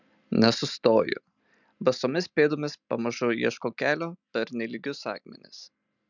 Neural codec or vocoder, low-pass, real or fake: none; 7.2 kHz; real